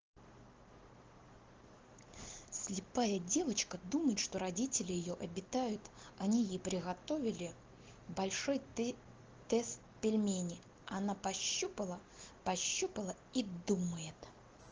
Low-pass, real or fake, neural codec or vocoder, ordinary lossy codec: 7.2 kHz; real; none; Opus, 16 kbps